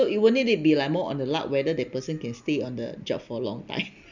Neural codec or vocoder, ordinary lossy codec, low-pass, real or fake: none; none; 7.2 kHz; real